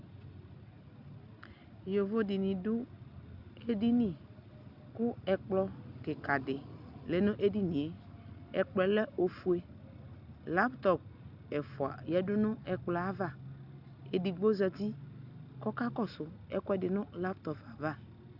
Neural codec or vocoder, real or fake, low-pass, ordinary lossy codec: none; real; 5.4 kHz; Opus, 64 kbps